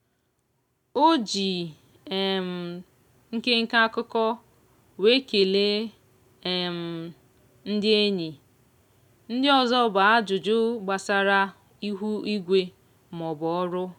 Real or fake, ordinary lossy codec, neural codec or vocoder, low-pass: real; none; none; 19.8 kHz